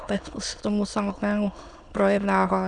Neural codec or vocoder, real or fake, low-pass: autoencoder, 22.05 kHz, a latent of 192 numbers a frame, VITS, trained on many speakers; fake; 9.9 kHz